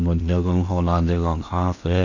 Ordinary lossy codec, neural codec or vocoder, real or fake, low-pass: none; codec, 16 kHz in and 24 kHz out, 0.8 kbps, FocalCodec, streaming, 65536 codes; fake; 7.2 kHz